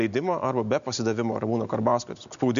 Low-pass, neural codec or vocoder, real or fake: 7.2 kHz; none; real